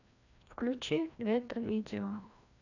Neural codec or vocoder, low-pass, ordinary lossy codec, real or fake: codec, 16 kHz, 1 kbps, FreqCodec, larger model; 7.2 kHz; none; fake